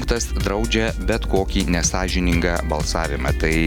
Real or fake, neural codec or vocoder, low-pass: real; none; 19.8 kHz